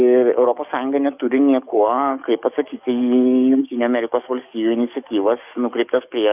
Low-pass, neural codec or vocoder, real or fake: 3.6 kHz; codec, 44.1 kHz, 7.8 kbps, DAC; fake